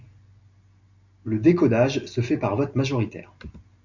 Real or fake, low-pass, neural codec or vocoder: real; 7.2 kHz; none